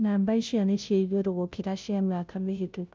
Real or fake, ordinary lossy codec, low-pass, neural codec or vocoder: fake; none; none; codec, 16 kHz, 0.5 kbps, FunCodec, trained on Chinese and English, 25 frames a second